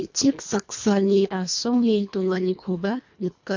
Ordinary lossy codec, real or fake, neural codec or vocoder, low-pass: MP3, 48 kbps; fake; codec, 24 kHz, 1.5 kbps, HILCodec; 7.2 kHz